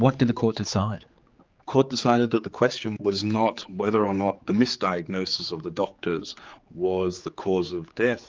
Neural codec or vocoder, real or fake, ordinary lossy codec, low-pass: codec, 16 kHz, 4 kbps, X-Codec, HuBERT features, trained on general audio; fake; Opus, 32 kbps; 7.2 kHz